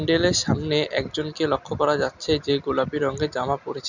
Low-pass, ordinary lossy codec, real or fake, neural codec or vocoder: 7.2 kHz; none; real; none